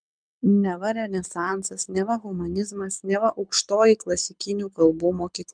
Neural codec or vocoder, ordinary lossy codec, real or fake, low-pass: codec, 24 kHz, 6 kbps, HILCodec; MP3, 96 kbps; fake; 9.9 kHz